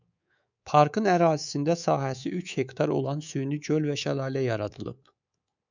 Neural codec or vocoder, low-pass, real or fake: codec, 24 kHz, 3.1 kbps, DualCodec; 7.2 kHz; fake